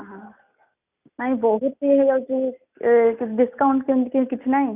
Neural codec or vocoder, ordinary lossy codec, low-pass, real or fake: none; none; 3.6 kHz; real